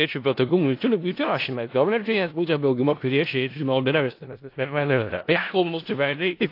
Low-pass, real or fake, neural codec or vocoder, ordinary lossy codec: 5.4 kHz; fake; codec, 16 kHz in and 24 kHz out, 0.4 kbps, LongCat-Audio-Codec, four codebook decoder; AAC, 32 kbps